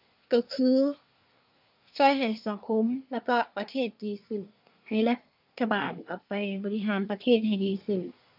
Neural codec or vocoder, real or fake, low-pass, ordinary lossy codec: codec, 24 kHz, 1 kbps, SNAC; fake; 5.4 kHz; none